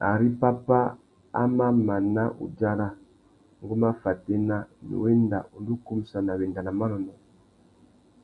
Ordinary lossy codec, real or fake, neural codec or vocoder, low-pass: MP3, 64 kbps; real; none; 10.8 kHz